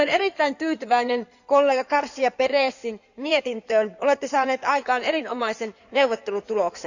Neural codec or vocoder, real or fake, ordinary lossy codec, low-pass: codec, 16 kHz in and 24 kHz out, 2.2 kbps, FireRedTTS-2 codec; fake; none; 7.2 kHz